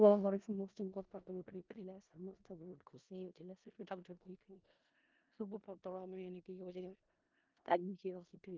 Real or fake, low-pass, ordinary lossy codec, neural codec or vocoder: fake; 7.2 kHz; Opus, 24 kbps; codec, 16 kHz in and 24 kHz out, 0.4 kbps, LongCat-Audio-Codec, four codebook decoder